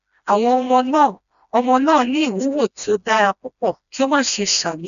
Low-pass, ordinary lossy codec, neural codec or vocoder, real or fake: 7.2 kHz; none; codec, 16 kHz, 1 kbps, FreqCodec, smaller model; fake